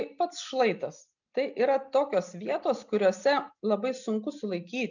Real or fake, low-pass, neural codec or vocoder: real; 7.2 kHz; none